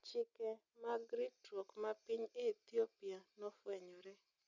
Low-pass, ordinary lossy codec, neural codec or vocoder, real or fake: 7.2 kHz; none; none; real